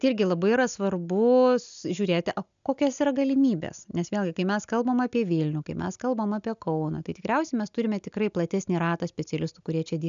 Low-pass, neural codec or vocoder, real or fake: 7.2 kHz; none; real